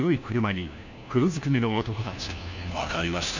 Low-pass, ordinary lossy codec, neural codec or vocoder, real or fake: 7.2 kHz; none; codec, 16 kHz, 1 kbps, FunCodec, trained on LibriTTS, 50 frames a second; fake